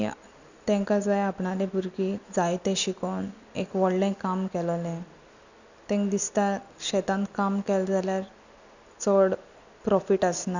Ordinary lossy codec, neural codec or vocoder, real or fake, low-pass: none; none; real; 7.2 kHz